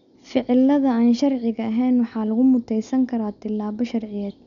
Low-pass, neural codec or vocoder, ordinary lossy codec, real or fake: 7.2 kHz; none; none; real